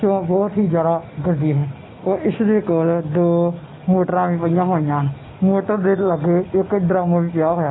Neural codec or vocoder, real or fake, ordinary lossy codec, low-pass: none; real; AAC, 16 kbps; 7.2 kHz